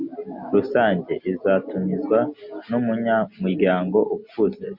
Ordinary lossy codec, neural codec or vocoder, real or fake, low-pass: MP3, 48 kbps; none; real; 5.4 kHz